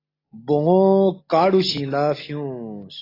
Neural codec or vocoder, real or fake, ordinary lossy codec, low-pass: none; real; AAC, 24 kbps; 5.4 kHz